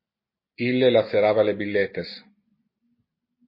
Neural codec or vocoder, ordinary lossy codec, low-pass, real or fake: none; MP3, 24 kbps; 5.4 kHz; real